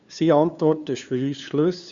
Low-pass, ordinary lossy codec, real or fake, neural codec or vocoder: 7.2 kHz; Opus, 64 kbps; fake; codec, 16 kHz, 2 kbps, FunCodec, trained on LibriTTS, 25 frames a second